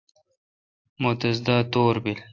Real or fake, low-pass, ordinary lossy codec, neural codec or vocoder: real; 7.2 kHz; AAC, 48 kbps; none